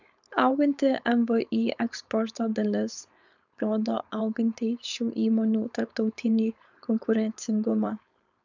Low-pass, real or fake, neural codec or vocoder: 7.2 kHz; fake; codec, 16 kHz, 4.8 kbps, FACodec